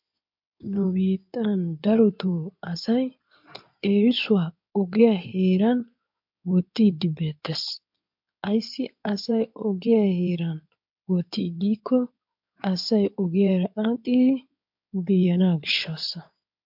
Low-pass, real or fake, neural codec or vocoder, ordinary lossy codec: 5.4 kHz; fake; codec, 16 kHz in and 24 kHz out, 2.2 kbps, FireRedTTS-2 codec; MP3, 48 kbps